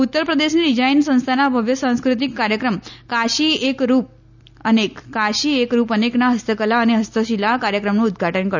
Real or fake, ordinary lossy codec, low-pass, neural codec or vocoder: real; none; 7.2 kHz; none